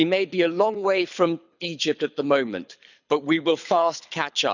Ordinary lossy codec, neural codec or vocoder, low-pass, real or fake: none; codec, 24 kHz, 6 kbps, HILCodec; 7.2 kHz; fake